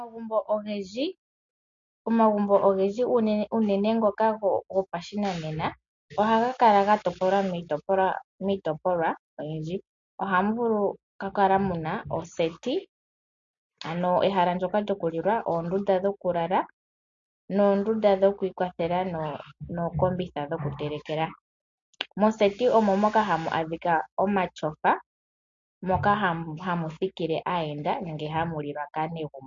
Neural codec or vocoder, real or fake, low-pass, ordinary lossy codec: none; real; 7.2 kHz; MP3, 48 kbps